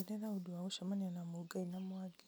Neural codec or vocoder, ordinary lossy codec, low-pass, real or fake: none; none; none; real